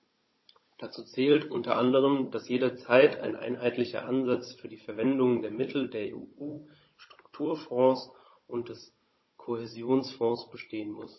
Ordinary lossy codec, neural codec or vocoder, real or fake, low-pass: MP3, 24 kbps; codec, 16 kHz, 16 kbps, FunCodec, trained on Chinese and English, 50 frames a second; fake; 7.2 kHz